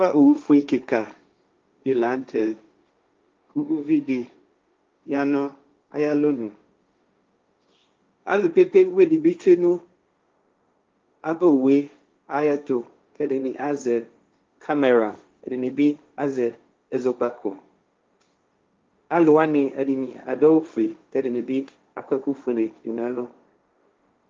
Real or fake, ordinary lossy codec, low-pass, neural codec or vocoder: fake; Opus, 32 kbps; 7.2 kHz; codec, 16 kHz, 1.1 kbps, Voila-Tokenizer